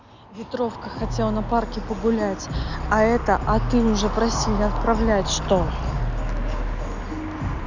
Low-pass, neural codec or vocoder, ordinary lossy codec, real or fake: 7.2 kHz; none; none; real